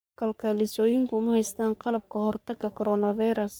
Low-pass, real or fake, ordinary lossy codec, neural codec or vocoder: none; fake; none; codec, 44.1 kHz, 3.4 kbps, Pupu-Codec